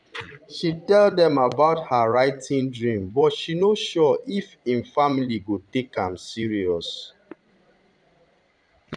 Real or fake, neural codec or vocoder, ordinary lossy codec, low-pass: fake; vocoder, 22.05 kHz, 80 mel bands, Vocos; none; 9.9 kHz